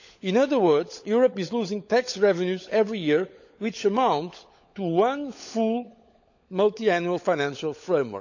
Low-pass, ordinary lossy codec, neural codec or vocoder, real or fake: 7.2 kHz; none; codec, 16 kHz, 16 kbps, FunCodec, trained on LibriTTS, 50 frames a second; fake